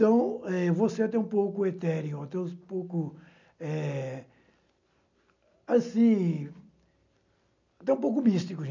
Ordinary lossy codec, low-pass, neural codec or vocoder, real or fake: none; 7.2 kHz; none; real